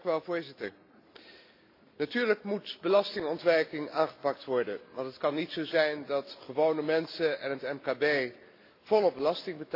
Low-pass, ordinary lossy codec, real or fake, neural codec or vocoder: 5.4 kHz; AAC, 32 kbps; real; none